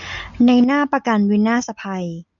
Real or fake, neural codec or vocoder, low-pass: real; none; 7.2 kHz